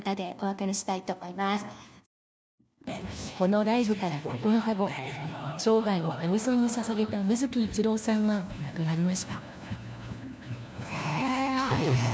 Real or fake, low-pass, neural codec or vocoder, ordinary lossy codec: fake; none; codec, 16 kHz, 1 kbps, FunCodec, trained on LibriTTS, 50 frames a second; none